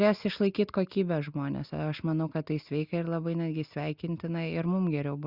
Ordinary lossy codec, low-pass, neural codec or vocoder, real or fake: Opus, 64 kbps; 5.4 kHz; none; real